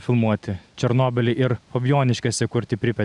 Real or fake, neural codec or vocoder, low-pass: real; none; 10.8 kHz